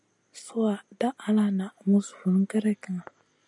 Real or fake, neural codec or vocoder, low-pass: real; none; 10.8 kHz